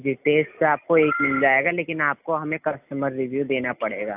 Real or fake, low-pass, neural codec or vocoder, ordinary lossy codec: real; 3.6 kHz; none; AAC, 32 kbps